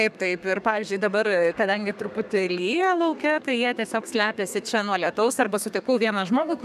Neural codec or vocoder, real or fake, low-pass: codec, 32 kHz, 1.9 kbps, SNAC; fake; 14.4 kHz